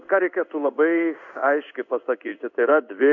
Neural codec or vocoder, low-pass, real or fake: codec, 16 kHz in and 24 kHz out, 1 kbps, XY-Tokenizer; 7.2 kHz; fake